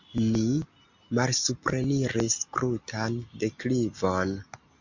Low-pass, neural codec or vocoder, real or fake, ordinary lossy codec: 7.2 kHz; none; real; MP3, 48 kbps